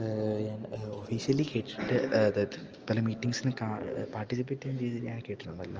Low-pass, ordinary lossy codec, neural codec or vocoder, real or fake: 7.2 kHz; Opus, 24 kbps; none; real